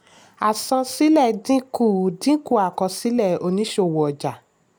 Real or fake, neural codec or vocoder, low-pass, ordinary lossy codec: real; none; none; none